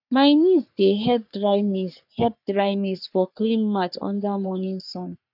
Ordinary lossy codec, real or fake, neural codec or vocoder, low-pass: none; fake; codec, 44.1 kHz, 3.4 kbps, Pupu-Codec; 5.4 kHz